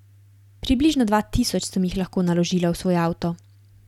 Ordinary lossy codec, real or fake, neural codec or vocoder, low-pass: none; real; none; 19.8 kHz